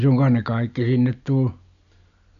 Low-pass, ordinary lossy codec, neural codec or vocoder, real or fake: 7.2 kHz; none; none; real